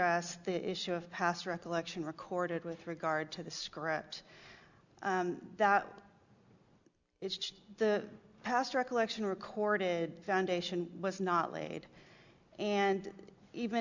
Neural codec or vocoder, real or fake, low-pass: none; real; 7.2 kHz